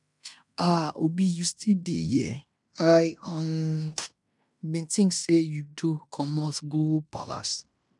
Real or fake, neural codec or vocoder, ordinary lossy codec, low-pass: fake; codec, 16 kHz in and 24 kHz out, 0.9 kbps, LongCat-Audio-Codec, fine tuned four codebook decoder; none; 10.8 kHz